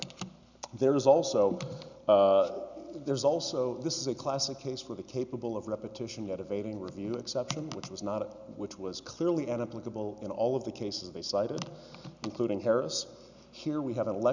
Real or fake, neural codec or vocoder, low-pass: real; none; 7.2 kHz